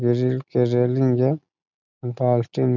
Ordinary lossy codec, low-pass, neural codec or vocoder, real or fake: none; 7.2 kHz; none; real